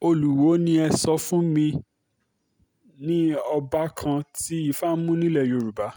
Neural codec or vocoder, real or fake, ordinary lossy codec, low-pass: none; real; none; none